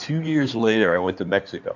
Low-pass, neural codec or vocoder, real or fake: 7.2 kHz; codec, 16 kHz in and 24 kHz out, 2.2 kbps, FireRedTTS-2 codec; fake